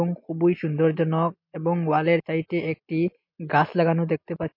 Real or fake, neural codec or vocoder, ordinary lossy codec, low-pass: real; none; MP3, 32 kbps; 5.4 kHz